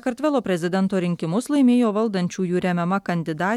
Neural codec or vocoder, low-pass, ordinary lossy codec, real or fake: none; 19.8 kHz; MP3, 96 kbps; real